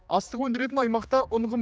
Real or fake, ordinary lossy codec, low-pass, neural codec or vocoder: fake; none; none; codec, 16 kHz, 2 kbps, X-Codec, HuBERT features, trained on general audio